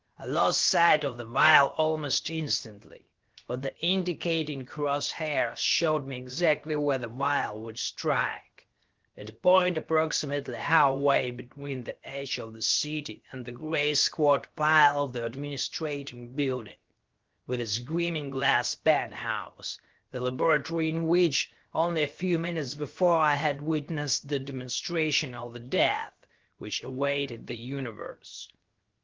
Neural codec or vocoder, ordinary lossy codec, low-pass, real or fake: codec, 16 kHz, 0.7 kbps, FocalCodec; Opus, 16 kbps; 7.2 kHz; fake